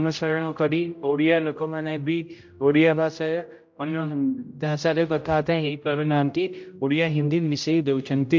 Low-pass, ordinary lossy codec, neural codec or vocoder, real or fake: 7.2 kHz; MP3, 48 kbps; codec, 16 kHz, 0.5 kbps, X-Codec, HuBERT features, trained on general audio; fake